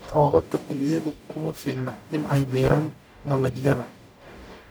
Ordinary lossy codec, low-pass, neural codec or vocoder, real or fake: none; none; codec, 44.1 kHz, 0.9 kbps, DAC; fake